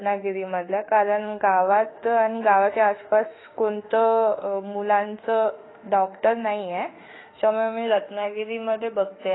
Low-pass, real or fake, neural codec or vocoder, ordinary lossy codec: 7.2 kHz; fake; autoencoder, 48 kHz, 32 numbers a frame, DAC-VAE, trained on Japanese speech; AAC, 16 kbps